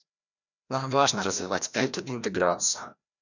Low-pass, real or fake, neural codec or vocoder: 7.2 kHz; fake; codec, 16 kHz, 1 kbps, FreqCodec, larger model